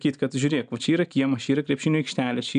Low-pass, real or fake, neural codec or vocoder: 9.9 kHz; real; none